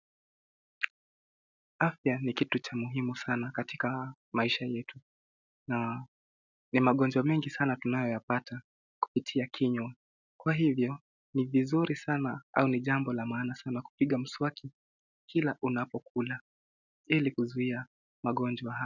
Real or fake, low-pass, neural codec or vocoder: real; 7.2 kHz; none